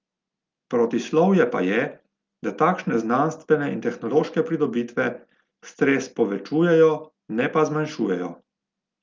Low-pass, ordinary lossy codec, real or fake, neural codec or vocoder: 7.2 kHz; Opus, 24 kbps; real; none